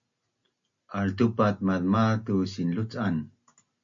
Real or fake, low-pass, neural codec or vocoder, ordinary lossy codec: real; 7.2 kHz; none; MP3, 48 kbps